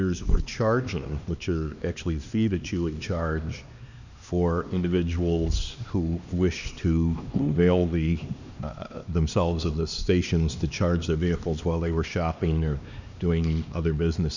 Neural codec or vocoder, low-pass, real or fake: codec, 16 kHz, 2 kbps, X-Codec, HuBERT features, trained on LibriSpeech; 7.2 kHz; fake